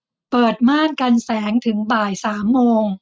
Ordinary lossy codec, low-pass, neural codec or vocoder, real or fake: none; none; none; real